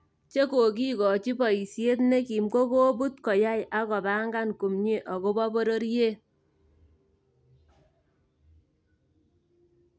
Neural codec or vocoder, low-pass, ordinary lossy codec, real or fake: none; none; none; real